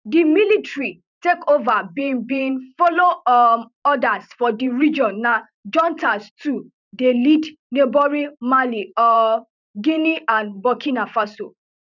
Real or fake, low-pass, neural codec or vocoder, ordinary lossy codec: fake; 7.2 kHz; vocoder, 44.1 kHz, 128 mel bands every 512 samples, BigVGAN v2; none